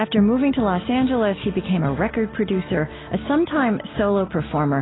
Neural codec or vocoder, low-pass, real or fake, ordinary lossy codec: none; 7.2 kHz; real; AAC, 16 kbps